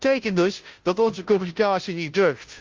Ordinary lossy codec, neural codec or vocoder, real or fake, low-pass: Opus, 32 kbps; codec, 16 kHz, 0.5 kbps, FunCodec, trained on Chinese and English, 25 frames a second; fake; 7.2 kHz